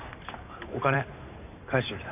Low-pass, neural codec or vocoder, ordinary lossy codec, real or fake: 3.6 kHz; vocoder, 44.1 kHz, 128 mel bands every 512 samples, BigVGAN v2; none; fake